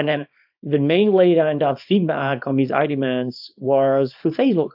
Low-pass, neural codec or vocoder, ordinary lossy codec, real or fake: 5.4 kHz; codec, 24 kHz, 0.9 kbps, WavTokenizer, small release; AAC, 48 kbps; fake